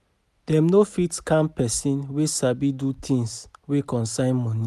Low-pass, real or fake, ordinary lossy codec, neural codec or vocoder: 14.4 kHz; real; none; none